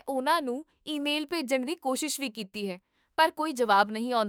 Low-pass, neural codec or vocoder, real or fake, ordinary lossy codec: none; autoencoder, 48 kHz, 32 numbers a frame, DAC-VAE, trained on Japanese speech; fake; none